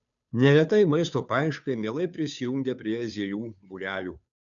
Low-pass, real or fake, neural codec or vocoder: 7.2 kHz; fake; codec, 16 kHz, 2 kbps, FunCodec, trained on Chinese and English, 25 frames a second